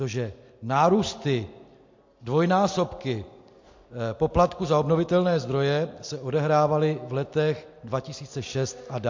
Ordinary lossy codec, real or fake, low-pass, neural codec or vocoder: MP3, 48 kbps; real; 7.2 kHz; none